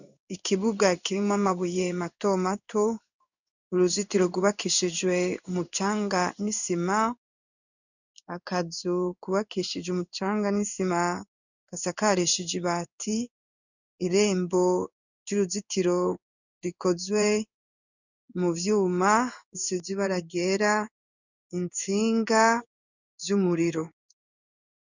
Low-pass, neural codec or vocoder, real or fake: 7.2 kHz; codec, 16 kHz in and 24 kHz out, 1 kbps, XY-Tokenizer; fake